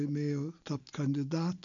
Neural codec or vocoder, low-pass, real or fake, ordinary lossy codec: none; 7.2 kHz; real; MP3, 96 kbps